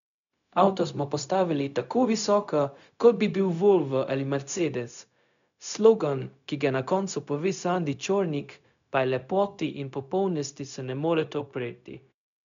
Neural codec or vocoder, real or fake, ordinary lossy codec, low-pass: codec, 16 kHz, 0.4 kbps, LongCat-Audio-Codec; fake; MP3, 96 kbps; 7.2 kHz